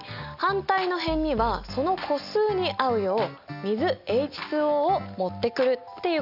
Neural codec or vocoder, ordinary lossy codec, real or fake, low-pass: none; none; real; 5.4 kHz